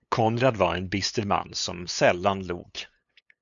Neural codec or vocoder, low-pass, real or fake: codec, 16 kHz, 8 kbps, FunCodec, trained on LibriTTS, 25 frames a second; 7.2 kHz; fake